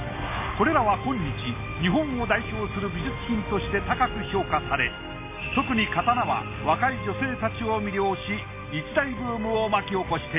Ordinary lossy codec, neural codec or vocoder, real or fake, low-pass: MP3, 24 kbps; none; real; 3.6 kHz